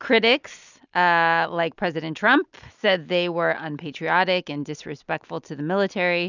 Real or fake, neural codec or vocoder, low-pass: real; none; 7.2 kHz